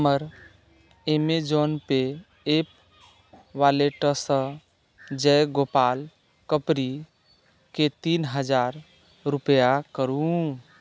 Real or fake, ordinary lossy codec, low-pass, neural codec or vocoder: real; none; none; none